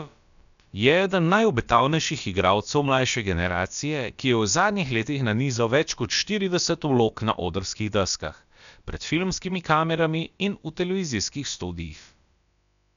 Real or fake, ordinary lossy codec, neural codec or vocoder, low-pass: fake; none; codec, 16 kHz, about 1 kbps, DyCAST, with the encoder's durations; 7.2 kHz